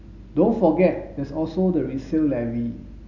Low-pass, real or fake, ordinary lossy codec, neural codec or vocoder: 7.2 kHz; real; none; none